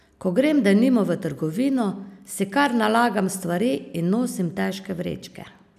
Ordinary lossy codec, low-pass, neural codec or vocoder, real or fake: none; 14.4 kHz; none; real